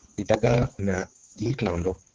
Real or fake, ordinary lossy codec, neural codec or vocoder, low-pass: fake; Opus, 16 kbps; codec, 16 kHz, 4 kbps, X-Codec, HuBERT features, trained on balanced general audio; 7.2 kHz